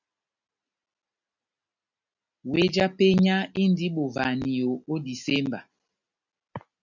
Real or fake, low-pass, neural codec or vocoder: real; 7.2 kHz; none